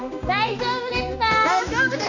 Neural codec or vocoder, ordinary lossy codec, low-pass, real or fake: codec, 16 kHz, 2 kbps, X-Codec, HuBERT features, trained on general audio; none; 7.2 kHz; fake